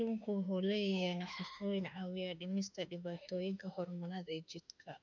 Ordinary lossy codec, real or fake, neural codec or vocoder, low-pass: none; fake; autoencoder, 48 kHz, 32 numbers a frame, DAC-VAE, trained on Japanese speech; 7.2 kHz